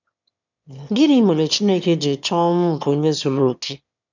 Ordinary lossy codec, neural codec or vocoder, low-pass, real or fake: none; autoencoder, 22.05 kHz, a latent of 192 numbers a frame, VITS, trained on one speaker; 7.2 kHz; fake